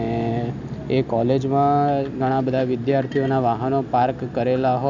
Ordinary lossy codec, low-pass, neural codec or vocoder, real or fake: none; 7.2 kHz; none; real